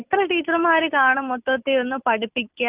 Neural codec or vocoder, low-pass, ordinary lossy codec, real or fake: none; 3.6 kHz; Opus, 24 kbps; real